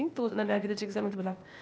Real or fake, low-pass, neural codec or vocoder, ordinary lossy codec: fake; none; codec, 16 kHz, 0.8 kbps, ZipCodec; none